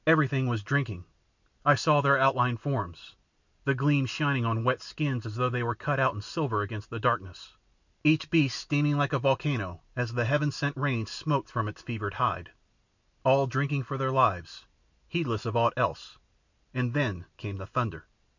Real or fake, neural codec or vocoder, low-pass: real; none; 7.2 kHz